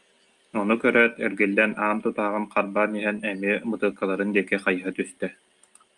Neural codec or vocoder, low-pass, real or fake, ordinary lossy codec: none; 10.8 kHz; real; Opus, 32 kbps